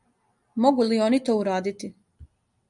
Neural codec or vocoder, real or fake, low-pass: none; real; 10.8 kHz